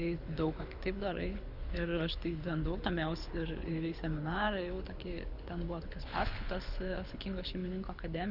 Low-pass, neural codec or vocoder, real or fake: 5.4 kHz; codec, 24 kHz, 6 kbps, HILCodec; fake